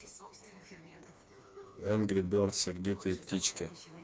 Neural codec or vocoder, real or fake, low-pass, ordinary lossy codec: codec, 16 kHz, 2 kbps, FreqCodec, smaller model; fake; none; none